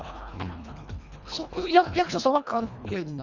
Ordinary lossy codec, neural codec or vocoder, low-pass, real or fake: none; codec, 24 kHz, 1.5 kbps, HILCodec; 7.2 kHz; fake